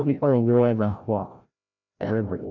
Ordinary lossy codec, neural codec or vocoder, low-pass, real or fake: none; codec, 16 kHz, 0.5 kbps, FreqCodec, larger model; 7.2 kHz; fake